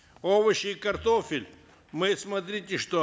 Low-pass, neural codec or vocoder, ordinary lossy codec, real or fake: none; none; none; real